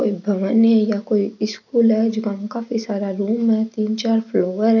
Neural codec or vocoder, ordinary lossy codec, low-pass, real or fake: none; none; 7.2 kHz; real